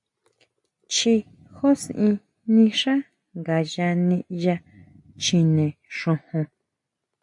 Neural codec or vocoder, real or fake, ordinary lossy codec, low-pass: none; real; AAC, 64 kbps; 10.8 kHz